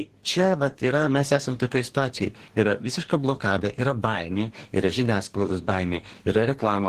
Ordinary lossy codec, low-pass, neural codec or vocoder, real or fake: Opus, 16 kbps; 14.4 kHz; codec, 44.1 kHz, 2.6 kbps, DAC; fake